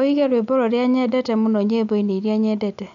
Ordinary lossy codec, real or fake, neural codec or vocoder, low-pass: none; real; none; 7.2 kHz